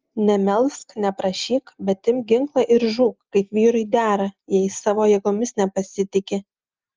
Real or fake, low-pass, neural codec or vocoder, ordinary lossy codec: real; 7.2 kHz; none; Opus, 24 kbps